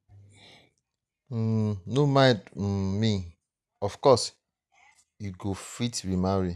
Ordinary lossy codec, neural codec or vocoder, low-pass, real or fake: none; none; none; real